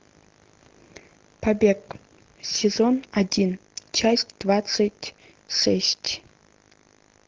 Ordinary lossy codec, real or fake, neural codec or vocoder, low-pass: Opus, 16 kbps; real; none; 7.2 kHz